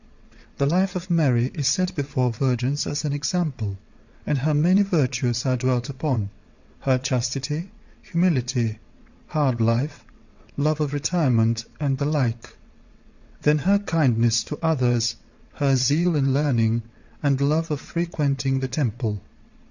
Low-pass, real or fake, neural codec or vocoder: 7.2 kHz; fake; vocoder, 22.05 kHz, 80 mel bands, WaveNeXt